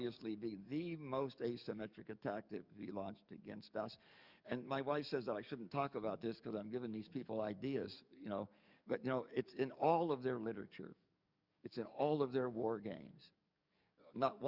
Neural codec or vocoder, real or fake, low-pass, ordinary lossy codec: codec, 44.1 kHz, 7.8 kbps, DAC; fake; 5.4 kHz; Opus, 64 kbps